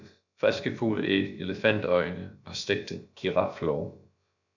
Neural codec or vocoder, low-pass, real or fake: codec, 16 kHz, about 1 kbps, DyCAST, with the encoder's durations; 7.2 kHz; fake